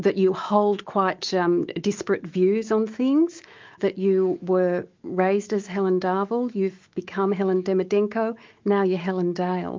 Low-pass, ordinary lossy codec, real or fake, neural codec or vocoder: 7.2 kHz; Opus, 24 kbps; fake; autoencoder, 48 kHz, 128 numbers a frame, DAC-VAE, trained on Japanese speech